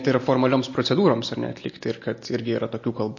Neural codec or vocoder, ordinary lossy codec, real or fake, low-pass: none; MP3, 32 kbps; real; 7.2 kHz